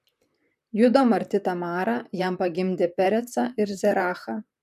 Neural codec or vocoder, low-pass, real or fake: vocoder, 44.1 kHz, 128 mel bands, Pupu-Vocoder; 14.4 kHz; fake